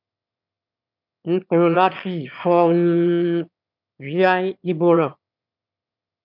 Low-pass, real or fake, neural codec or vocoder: 5.4 kHz; fake; autoencoder, 22.05 kHz, a latent of 192 numbers a frame, VITS, trained on one speaker